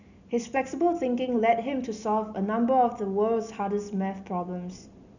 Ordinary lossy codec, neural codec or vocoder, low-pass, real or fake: none; none; 7.2 kHz; real